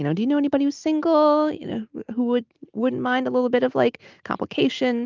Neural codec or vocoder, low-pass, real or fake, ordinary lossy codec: none; 7.2 kHz; real; Opus, 32 kbps